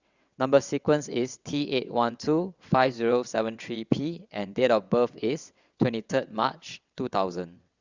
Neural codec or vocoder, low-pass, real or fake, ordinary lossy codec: vocoder, 22.05 kHz, 80 mel bands, WaveNeXt; 7.2 kHz; fake; Opus, 64 kbps